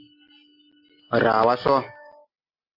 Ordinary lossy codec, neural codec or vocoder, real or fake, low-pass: AAC, 32 kbps; none; real; 5.4 kHz